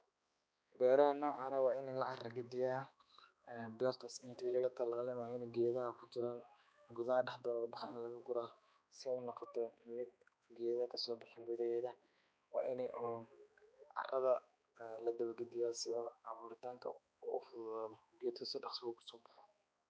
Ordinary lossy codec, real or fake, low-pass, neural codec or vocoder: none; fake; none; codec, 16 kHz, 2 kbps, X-Codec, HuBERT features, trained on balanced general audio